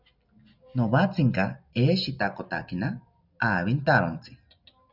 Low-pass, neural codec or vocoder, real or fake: 5.4 kHz; none; real